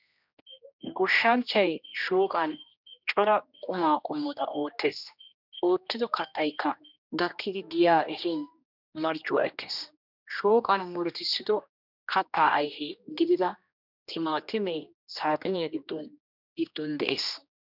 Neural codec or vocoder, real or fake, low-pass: codec, 16 kHz, 1 kbps, X-Codec, HuBERT features, trained on general audio; fake; 5.4 kHz